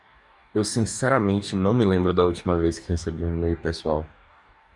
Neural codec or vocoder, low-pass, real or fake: codec, 44.1 kHz, 2.6 kbps, DAC; 10.8 kHz; fake